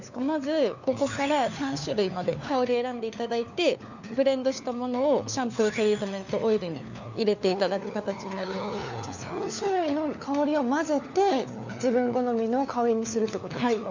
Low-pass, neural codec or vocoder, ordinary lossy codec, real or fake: 7.2 kHz; codec, 16 kHz, 4 kbps, FunCodec, trained on LibriTTS, 50 frames a second; none; fake